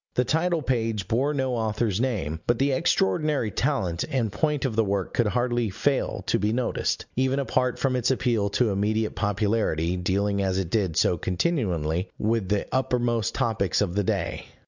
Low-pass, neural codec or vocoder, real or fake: 7.2 kHz; none; real